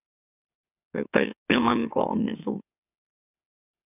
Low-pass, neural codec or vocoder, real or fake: 3.6 kHz; autoencoder, 44.1 kHz, a latent of 192 numbers a frame, MeloTTS; fake